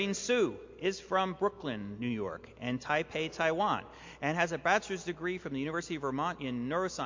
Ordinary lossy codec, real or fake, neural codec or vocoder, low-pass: MP3, 48 kbps; real; none; 7.2 kHz